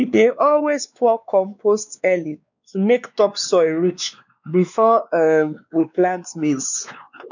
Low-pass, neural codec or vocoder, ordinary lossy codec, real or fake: 7.2 kHz; codec, 16 kHz, 2 kbps, X-Codec, WavLM features, trained on Multilingual LibriSpeech; none; fake